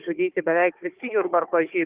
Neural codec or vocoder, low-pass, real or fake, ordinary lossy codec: codec, 16 kHz, 4 kbps, FunCodec, trained on Chinese and English, 50 frames a second; 3.6 kHz; fake; Opus, 24 kbps